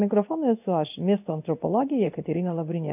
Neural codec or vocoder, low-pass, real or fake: codec, 16 kHz in and 24 kHz out, 1 kbps, XY-Tokenizer; 3.6 kHz; fake